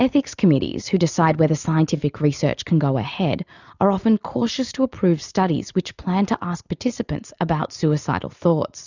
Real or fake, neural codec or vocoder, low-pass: real; none; 7.2 kHz